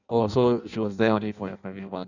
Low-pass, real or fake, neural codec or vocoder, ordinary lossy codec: 7.2 kHz; fake; codec, 16 kHz in and 24 kHz out, 0.6 kbps, FireRedTTS-2 codec; none